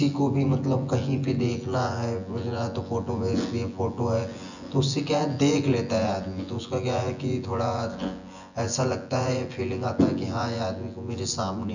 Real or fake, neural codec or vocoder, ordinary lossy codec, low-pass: fake; vocoder, 24 kHz, 100 mel bands, Vocos; none; 7.2 kHz